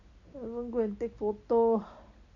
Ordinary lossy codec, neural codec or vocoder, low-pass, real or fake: none; none; 7.2 kHz; real